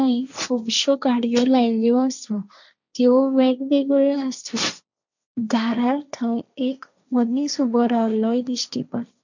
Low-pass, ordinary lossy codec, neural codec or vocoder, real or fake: 7.2 kHz; none; codec, 16 kHz, 1.1 kbps, Voila-Tokenizer; fake